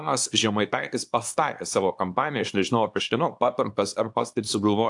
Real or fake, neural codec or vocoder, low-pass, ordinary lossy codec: fake; codec, 24 kHz, 0.9 kbps, WavTokenizer, small release; 10.8 kHz; AAC, 64 kbps